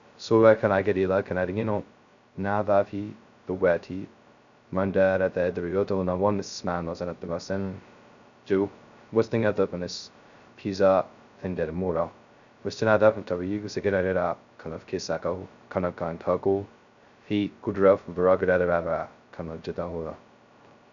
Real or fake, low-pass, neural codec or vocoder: fake; 7.2 kHz; codec, 16 kHz, 0.2 kbps, FocalCodec